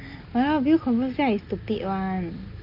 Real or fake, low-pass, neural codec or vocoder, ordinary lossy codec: real; 5.4 kHz; none; Opus, 24 kbps